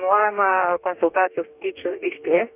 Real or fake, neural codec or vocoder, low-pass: fake; codec, 44.1 kHz, 2.6 kbps, DAC; 3.6 kHz